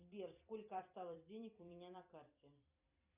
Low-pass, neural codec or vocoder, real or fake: 3.6 kHz; vocoder, 44.1 kHz, 128 mel bands every 256 samples, BigVGAN v2; fake